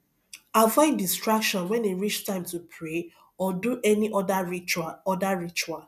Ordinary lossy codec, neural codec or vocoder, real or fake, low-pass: none; none; real; 14.4 kHz